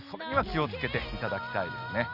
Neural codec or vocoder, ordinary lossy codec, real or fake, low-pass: none; none; real; 5.4 kHz